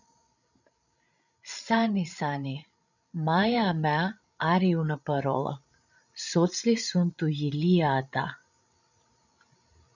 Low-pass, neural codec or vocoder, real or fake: 7.2 kHz; codec, 16 kHz, 16 kbps, FreqCodec, larger model; fake